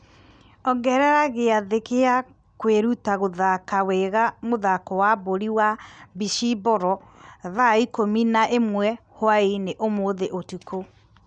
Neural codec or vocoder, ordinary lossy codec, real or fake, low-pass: none; none; real; 9.9 kHz